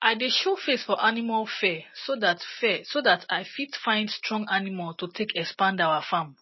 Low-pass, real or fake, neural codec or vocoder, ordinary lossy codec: 7.2 kHz; real; none; MP3, 24 kbps